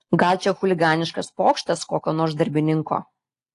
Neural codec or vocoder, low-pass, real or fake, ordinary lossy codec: none; 10.8 kHz; real; AAC, 48 kbps